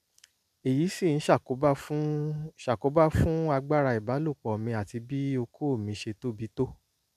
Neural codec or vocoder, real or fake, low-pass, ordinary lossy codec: none; real; 14.4 kHz; none